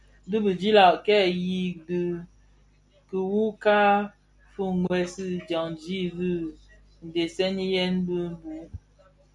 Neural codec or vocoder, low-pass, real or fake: none; 10.8 kHz; real